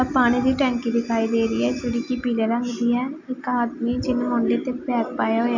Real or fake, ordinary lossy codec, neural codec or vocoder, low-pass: real; none; none; 7.2 kHz